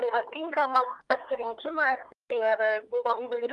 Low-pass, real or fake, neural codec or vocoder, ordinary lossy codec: 10.8 kHz; fake; codec, 24 kHz, 1 kbps, SNAC; Opus, 32 kbps